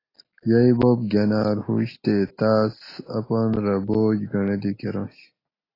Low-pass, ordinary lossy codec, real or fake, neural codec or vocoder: 5.4 kHz; AAC, 24 kbps; real; none